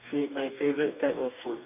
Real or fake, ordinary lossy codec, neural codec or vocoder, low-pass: fake; none; codec, 44.1 kHz, 2.6 kbps, DAC; 3.6 kHz